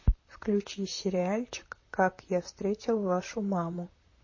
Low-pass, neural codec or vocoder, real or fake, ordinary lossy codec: 7.2 kHz; vocoder, 44.1 kHz, 128 mel bands, Pupu-Vocoder; fake; MP3, 32 kbps